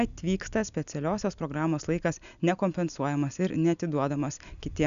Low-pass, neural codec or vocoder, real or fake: 7.2 kHz; none; real